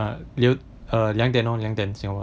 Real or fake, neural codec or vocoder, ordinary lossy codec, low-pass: real; none; none; none